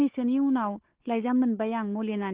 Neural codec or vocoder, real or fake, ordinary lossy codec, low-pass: codec, 16 kHz, 4 kbps, FunCodec, trained on Chinese and English, 50 frames a second; fake; Opus, 16 kbps; 3.6 kHz